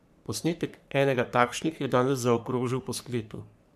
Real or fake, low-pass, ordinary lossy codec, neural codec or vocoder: fake; 14.4 kHz; none; codec, 44.1 kHz, 3.4 kbps, Pupu-Codec